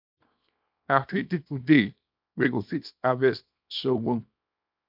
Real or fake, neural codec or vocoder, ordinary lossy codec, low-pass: fake; codec, 24 kHz, 0.9 kbps, WavTokenizer, small release; MP3, 48 kbps; 5.4 kHz